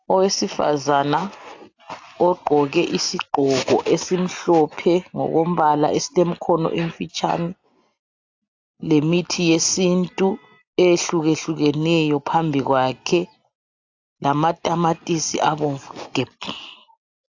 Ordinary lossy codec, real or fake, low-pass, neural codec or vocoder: AAC, 48 kbps; real; 7.2 kHz; none